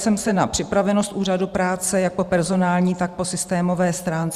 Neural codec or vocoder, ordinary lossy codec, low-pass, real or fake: vocoder, 44.1 kHz, 128 mel bands every 512 samples, BigVGAN v2; Opus, 64 kbps; 14.4 kHz; fake